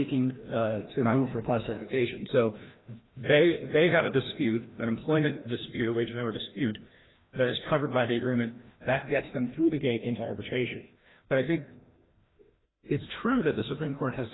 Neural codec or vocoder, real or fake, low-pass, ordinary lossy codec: codec, 16 kHz, 1 kbps, FreqCodec, larger model; fake; 7.2 kHz; AAC, 16 kbps